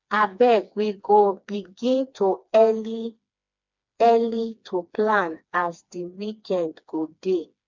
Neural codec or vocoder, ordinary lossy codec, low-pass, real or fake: codec, 16 kHz, 2 kbps, FreqCodec, smaller model; MP3, 64 kbps; 7.2 kHz; fake